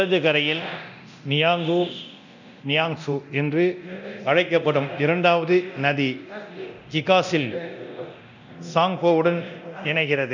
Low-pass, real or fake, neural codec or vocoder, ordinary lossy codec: 7.2 kHz; fake; codec, 24 kHz, 0.9 kbps, DualCodec; none